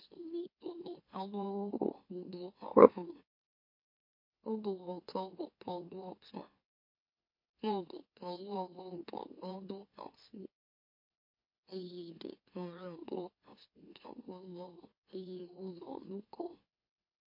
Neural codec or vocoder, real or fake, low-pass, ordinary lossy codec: autoencoder, 44.1 kHz, a latent of 192 numbers a frame, MeloTTS; fake; 5.4 kHz; MP3, 32 kbps